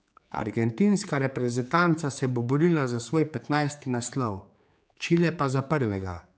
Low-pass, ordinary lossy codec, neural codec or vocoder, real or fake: none; none; codec, 16 kHz, 4 kbps, X-Codec, HuBERT features, trained on general audio; fake